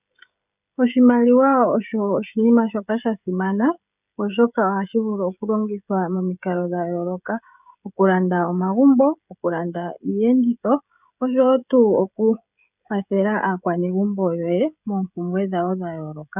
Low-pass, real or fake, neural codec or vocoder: 3.6 kHz; fake; codec, 16 kHz, 16 kbps, FreqCodec, smaller model